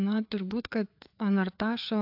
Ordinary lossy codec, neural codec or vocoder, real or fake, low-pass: AAC, 48 kbps; codec, 16 kHz, 4 kbps, FreqCodec, larger model; fake; 5.4 kHz